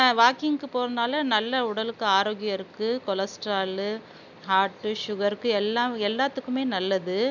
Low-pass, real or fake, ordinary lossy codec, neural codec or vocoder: 7.2 kHz; real; none; none